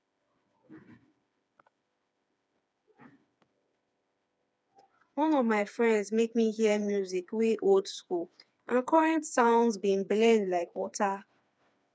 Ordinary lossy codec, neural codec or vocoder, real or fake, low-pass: none; codec, 16 kHz, 4 kbps, FreqCodec, smaller model; fake; none